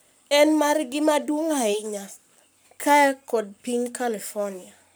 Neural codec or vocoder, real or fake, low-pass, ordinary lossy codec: codec, 44.1 kHz, 7.8 kbps, Pupu-Codec; fake; none; none